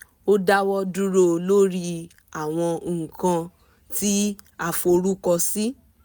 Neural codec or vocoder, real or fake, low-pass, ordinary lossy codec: none; real; none; none